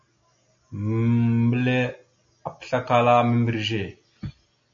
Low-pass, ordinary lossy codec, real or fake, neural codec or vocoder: 7.2 kHz; MP3, 48 kbps; real; none